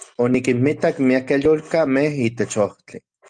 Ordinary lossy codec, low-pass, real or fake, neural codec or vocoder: Opus, 32 kbps; 9.9 kHz; real; none